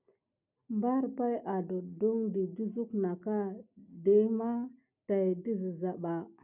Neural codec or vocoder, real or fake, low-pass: none; real; 3.6 kHz